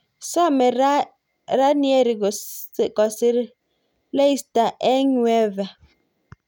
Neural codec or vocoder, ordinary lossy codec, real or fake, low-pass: none; none; real; 19.8 kHz